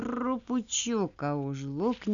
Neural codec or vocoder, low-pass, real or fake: none; 7.2 kHz; real